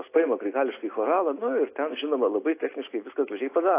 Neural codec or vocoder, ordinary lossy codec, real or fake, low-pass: none; AAC, 24 kbps; real; 3.6 kHz